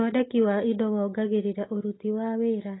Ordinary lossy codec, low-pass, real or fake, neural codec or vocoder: AAC, 16 kbps; 7.2 kHz; real; none